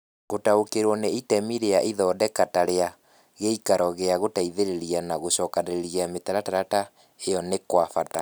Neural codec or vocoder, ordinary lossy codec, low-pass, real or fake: none; none; none; real